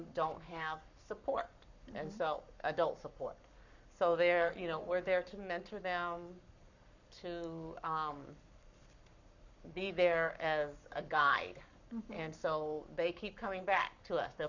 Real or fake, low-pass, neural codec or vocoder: fake; 7.2 kHz; codec, 44.1 kHz, 7.8 kbps, Pupu-Codec